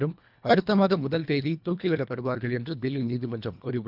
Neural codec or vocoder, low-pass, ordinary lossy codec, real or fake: codec, 24 kHz, 1.5 kbps, HILCodec; 5.4 kHz; none; fake